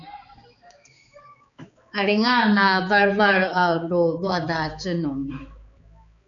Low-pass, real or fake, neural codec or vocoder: 7.2 kHz; fake; codec, 16 kHz, 4 kbps, X-Codec, HuBERT features, trained on balanced general audio